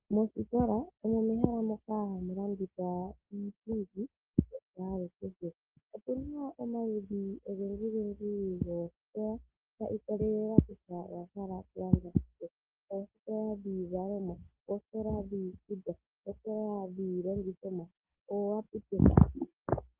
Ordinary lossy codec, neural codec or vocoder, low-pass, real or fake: Opus, 16 kbps; none; 3.6 kHz; real